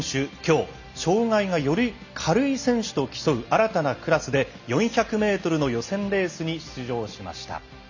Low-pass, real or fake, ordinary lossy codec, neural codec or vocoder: 7.2 kHz; real; none; none